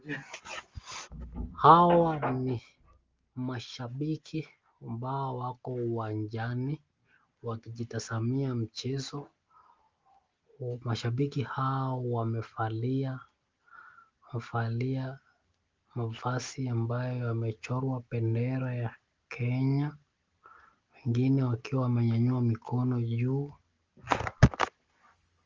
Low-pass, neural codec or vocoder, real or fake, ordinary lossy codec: 7.2 kHz; none; real; Opus, 32 kbps